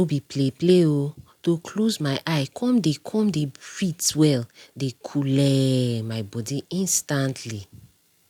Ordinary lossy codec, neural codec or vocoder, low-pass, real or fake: none; none; 19.8 kHz; real